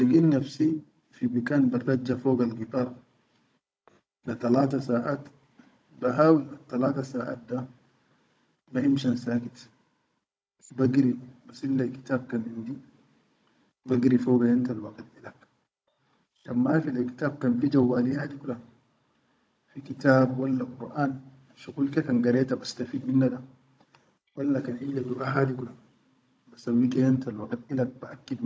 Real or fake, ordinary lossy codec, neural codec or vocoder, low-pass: fake; none; codec, 16 kHz, 16 kbps, FunCodec, trained on Chinese and English, 50 frames a second; none